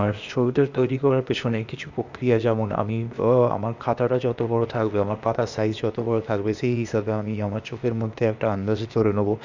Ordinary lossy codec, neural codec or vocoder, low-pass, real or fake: Opus, 64 kbps; codec, 16 kHz, 0.8 kbps, ZipCodec; 7.2 kHz; fake